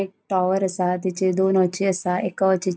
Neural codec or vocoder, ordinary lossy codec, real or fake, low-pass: none; none; real; none